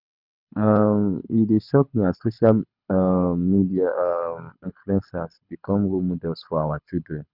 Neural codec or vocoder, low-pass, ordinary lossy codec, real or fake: codec, 24 kHz, 6 kbps, HILCodec; 5.4 kHz; none; fake